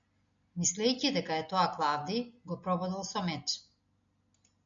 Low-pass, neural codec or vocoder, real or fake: 7.2 kHz; none; real